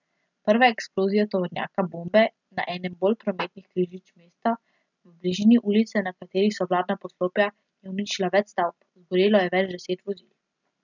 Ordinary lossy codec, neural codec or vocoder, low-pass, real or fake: none; none; 7.2 kHz; real